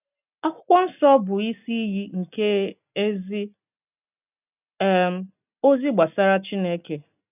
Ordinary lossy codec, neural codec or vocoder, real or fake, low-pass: none; none; real; 3.6 kHz